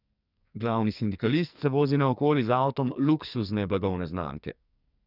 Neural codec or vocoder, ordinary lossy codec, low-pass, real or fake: codec, 44.1 kHz, 2.6 kbps, SNAC; none; 5.4 kHz; fake